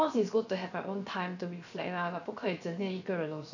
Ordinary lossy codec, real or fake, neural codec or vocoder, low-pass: none; fake; codec, 16 kHz, 0.7 kbps, FocalCodec; 7.2 kHz